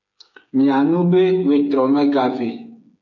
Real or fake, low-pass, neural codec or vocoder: fake; 7.2 kHz; codec, 16 kHz, 8 kbps, FreqCodec, smaller model